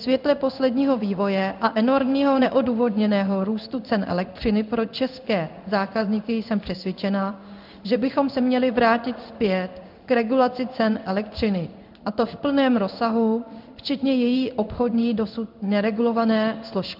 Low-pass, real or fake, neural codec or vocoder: 5.4 kHz; fake; codec, 16 kHz in and 24 kHz out, 1 kbps, XY-Tokenizer